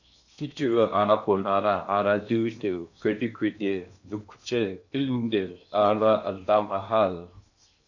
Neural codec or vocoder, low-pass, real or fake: codec, 16 kHz in and 24 kHz out, 0.8 kbps, FocalCodec, streaming, 65536 codes; 7.2 kHz; fake